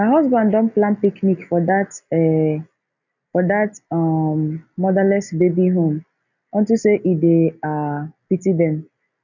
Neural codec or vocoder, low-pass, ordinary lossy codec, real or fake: none; 7.2 kHz; none; real